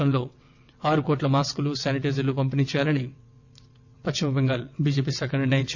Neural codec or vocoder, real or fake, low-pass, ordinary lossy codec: vocoder, 22.05 kHz, 80 mel bands, WaveNeXt; fake; 7.2 kHz; none